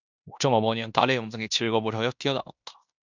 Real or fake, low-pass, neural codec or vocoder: fake; 7.2 kHz; codec, 16 kHz in and 24 kHz out, 0.9 kbps, LongCat-Audio-Codec, fine tuned four codebook decoder